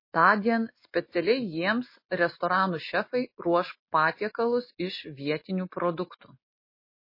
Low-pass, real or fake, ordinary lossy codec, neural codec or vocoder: 5.4 kHz; real; MP3, 24 kbps; none